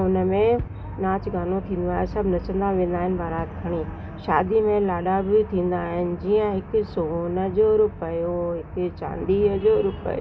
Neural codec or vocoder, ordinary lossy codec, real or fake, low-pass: none; none; real; none